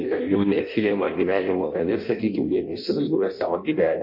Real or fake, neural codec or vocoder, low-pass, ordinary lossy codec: fake; codec, 16 kHz in and 24 kHz out, 0.6 kbps, FireRedTTS-2 codec; 5.4 kHz; MP3, 32 kbps